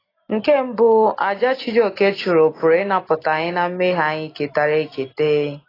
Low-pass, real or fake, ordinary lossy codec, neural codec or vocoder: 5.4 kHz; real; AAC, 24 kbps; none